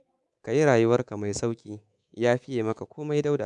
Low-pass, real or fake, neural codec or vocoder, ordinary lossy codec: 10.8 kHz; fake; codec, 24 kHz, 3.1 kbps, DualCodec; none